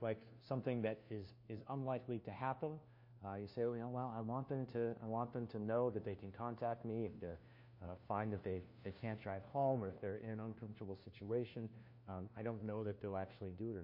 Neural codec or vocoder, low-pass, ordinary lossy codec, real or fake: codec, 16 kHz, 1 kbps, FunCodec, trained on LibriTTS, 50 frames a second; 5.4 kHz; MP3, 32 kbps; fake